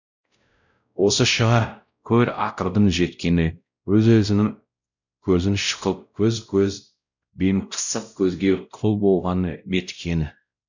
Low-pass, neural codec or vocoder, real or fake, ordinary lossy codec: 7.2 kHz; codec, 16 kHz, 0.5 kbps, X-Codec, WavLM features, trained on Multilingual LibriSpeech; fake; none